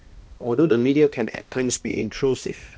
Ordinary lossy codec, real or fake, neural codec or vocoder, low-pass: none; fake; codec, 16 kHz, 1 kbps, X-Codec, HuBERT features, trained on balanced general audio; none